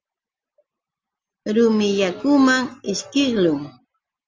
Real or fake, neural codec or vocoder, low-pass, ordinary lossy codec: real; none; 7.2 kHz; Opus, 32 kbps